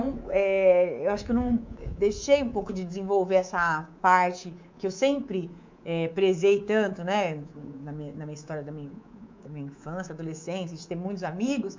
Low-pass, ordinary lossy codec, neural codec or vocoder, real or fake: 7.2 kHz; MP3, 64 kbps; codec, 24 kHz, 3.1 kbps, DualCodec; fake